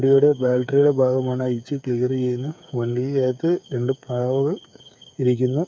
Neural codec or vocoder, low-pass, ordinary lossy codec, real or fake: codec, 16 kHz, 8 kbps, FreqCodec, smaller model; none; none; fake